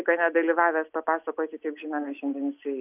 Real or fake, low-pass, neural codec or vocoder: real; 3.6 kHz; none